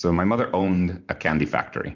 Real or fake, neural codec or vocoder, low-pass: fake; vocoder, 44.1 kHz, 128 mel bands every 512 samples, BigVGAN v2; 7.2 kHz